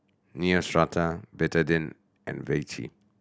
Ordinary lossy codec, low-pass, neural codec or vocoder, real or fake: none; none; none; real